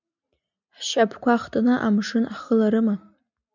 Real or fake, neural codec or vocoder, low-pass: real; none; 7.2 kHz